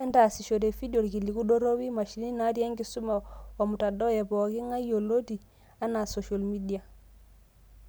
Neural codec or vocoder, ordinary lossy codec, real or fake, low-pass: none; none; real; none